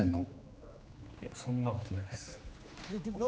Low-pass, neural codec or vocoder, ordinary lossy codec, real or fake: none; codec, 16 kHz, 2 kbps, X-Codec, HuBERT features, trained on general audio; none; fake